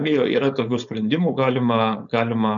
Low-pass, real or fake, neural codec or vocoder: 7.2 kHz; fake; codec, 16 kHz, 4.8 kbps, FACodec